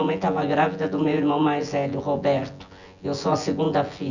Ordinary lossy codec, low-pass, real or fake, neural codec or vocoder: none; 7.2 kHz; fake; vocoder, 24 kHz, 100 mel bands, Vocos